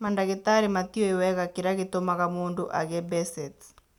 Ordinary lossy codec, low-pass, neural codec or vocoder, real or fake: none; 19.8 kHz; none; real